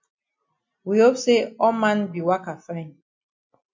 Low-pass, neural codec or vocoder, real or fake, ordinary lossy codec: 7.2 kHz; none; real; MP3, 48 kbps